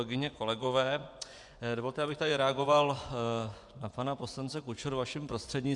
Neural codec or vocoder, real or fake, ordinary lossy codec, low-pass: vocoder, 24 kHz, 100 mel bands, Vocos; fake; MP3, 96 kbps; 10.8 kHz